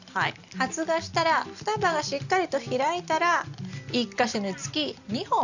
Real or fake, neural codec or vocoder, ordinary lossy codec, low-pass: real; none; none; 7.2 kHz